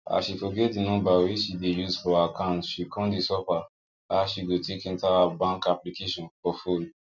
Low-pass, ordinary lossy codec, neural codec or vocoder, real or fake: 7.2 kHz; none; none; real